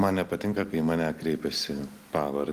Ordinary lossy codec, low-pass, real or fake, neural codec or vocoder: Opus, 24 kbps; 14.4 kHz; real; none